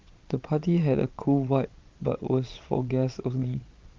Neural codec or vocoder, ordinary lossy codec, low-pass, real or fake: vocoder, 22.05 kHz, 80 mel bands, Vocos; Opus, 24 kbps; 7.2 kHz; fake